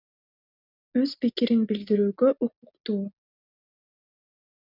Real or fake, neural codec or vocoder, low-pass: real; none; 5.4 kHz